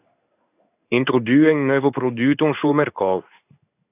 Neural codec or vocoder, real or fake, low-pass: codec, 16 kHz in and 24 kHz out, 1 kbps, XY-Tokenizer; fake; 3.6 kHz